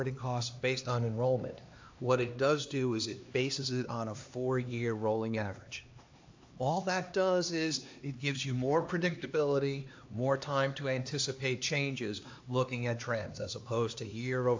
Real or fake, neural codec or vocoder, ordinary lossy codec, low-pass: fake; codec, 16 kHz, 2 kbps, X-Codec, HuBERT features, trained on LibriSpeech; AAC, 48 kbps; 7.2 kHz